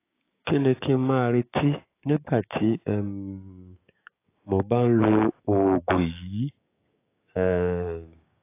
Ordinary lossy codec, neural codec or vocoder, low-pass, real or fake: AAC, 24 kbps; codec, 16 kHz, 6 kbps, DAC; 3.6 kHz; fake